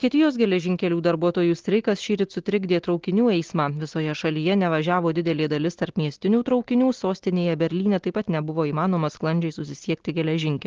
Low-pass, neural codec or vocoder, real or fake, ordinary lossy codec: 7.2 kHz; none; real; Opus, 16 kbps